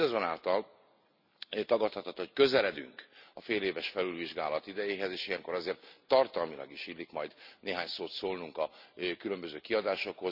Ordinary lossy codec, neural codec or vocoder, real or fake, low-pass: none; none; real; 5.4 kHz